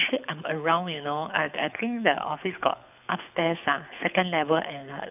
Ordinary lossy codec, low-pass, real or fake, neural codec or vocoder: none; 3.6 kHz; fake; codec, 24 kHz, 6 kbps, HILCodec